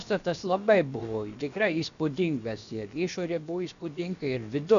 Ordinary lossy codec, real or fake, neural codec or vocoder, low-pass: MP3, 96 kbps; fake; codec, 16 kHz, about 1 kbps, DyCAST, with the encoder's durations; 7.2 kHz